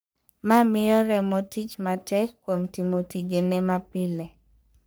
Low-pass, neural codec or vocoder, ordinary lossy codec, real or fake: none; codec, 44.1 kHz, 3.4 kbps, Pupu-Codec; none; fake